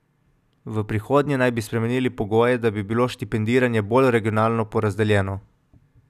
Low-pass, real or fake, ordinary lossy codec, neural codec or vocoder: 14.4 kHz; real; none; none